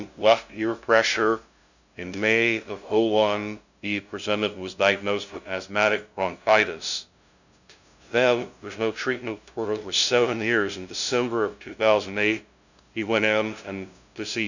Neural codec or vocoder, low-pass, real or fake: codec, 16 kHz, 0.5 kbps, FunCodec, trained on LibriTTS, 25 frames a second; 7.2 kHz; fake